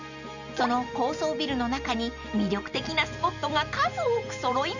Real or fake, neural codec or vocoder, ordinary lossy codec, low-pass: real; none; none; 7.2 kHz